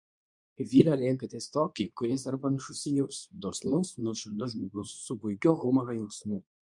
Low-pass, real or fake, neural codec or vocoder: 10.8 kHz; fake; codec, 24 kHz, 0.9 kbps, WavTokenizer, medium speech release version 2